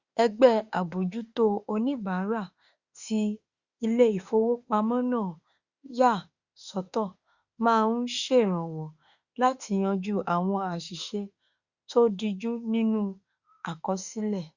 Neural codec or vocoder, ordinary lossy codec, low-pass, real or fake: autoencoder, 48 kHz, 32 numbers a frame, DAC-VAE, trained on Japanese speech; Opus, 64 kbps; 7.2 kHz; fake